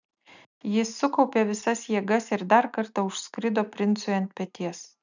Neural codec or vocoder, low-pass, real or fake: none; 7.2 kHz; real